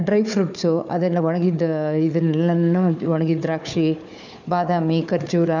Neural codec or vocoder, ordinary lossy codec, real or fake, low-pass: codec, 16 kHz, 4 kbps, FunCodec, trained on Chinese and English, 50 frames a second; none; fake; 7.2 kHz